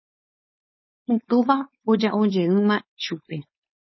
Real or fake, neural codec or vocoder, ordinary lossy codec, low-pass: fake; codec, 16 kHz, 4.8 kbps, FACodec; MP3, 24 kbps; 7.2 kHz